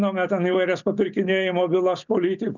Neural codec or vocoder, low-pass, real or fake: none; 7.2 kHz; real